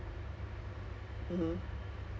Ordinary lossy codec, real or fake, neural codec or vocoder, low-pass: none; real; none; none